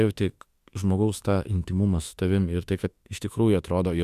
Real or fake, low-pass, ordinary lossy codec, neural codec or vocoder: fake; 14.4 kHz; Opus, 64 kbps; autoencoder, 48 kHz, 32 numbers a frame, DAC-VAE, trained on Japanese speech